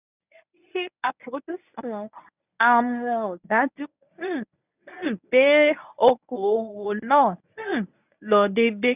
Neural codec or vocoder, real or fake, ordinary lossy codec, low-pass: codec, 24 kHz, 0.9 kbps, WavTokenizer, medium speech release version 1; fake; none; 3.6 kHz